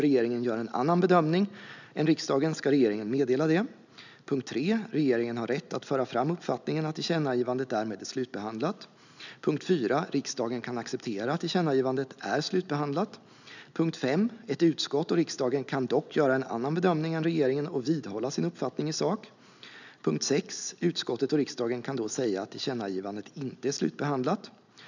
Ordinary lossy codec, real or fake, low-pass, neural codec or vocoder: none; real; 7.2 kHz; none